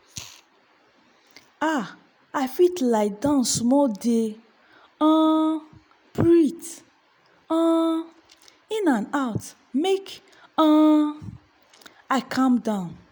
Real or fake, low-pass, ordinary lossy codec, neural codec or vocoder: real; none; none; none